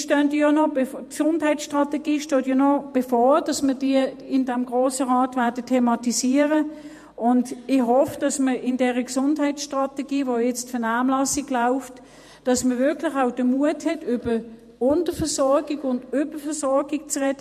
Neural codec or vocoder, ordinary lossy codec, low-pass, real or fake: vocoder, 48 kHz, 128 mel bands, Vocos; MP3, 64 kbps; 14.4 kHz; fake